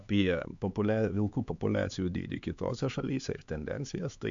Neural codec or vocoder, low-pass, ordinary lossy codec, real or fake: codec, 16 kHz, 4 kbps, X-Codec, HuBERT features, trained on LibriSpeech; 7.2 kHz; AAC, 64 kbps; fake